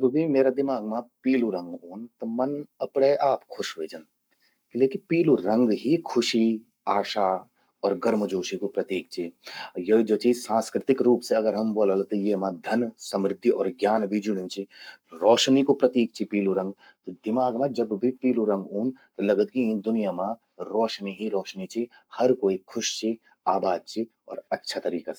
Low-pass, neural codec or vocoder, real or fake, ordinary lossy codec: none; codec, 44.1 kHz, 7.8 kbps, Pupu-Codec; fake; none